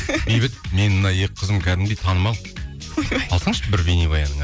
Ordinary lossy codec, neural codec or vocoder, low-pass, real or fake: none; none; none; real